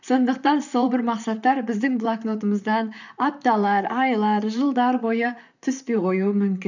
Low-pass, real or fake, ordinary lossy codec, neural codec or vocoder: 7.2 kHz; fake; none; vocoder, 44.1 kHz, 128 mel bands, Pupu-Vocoder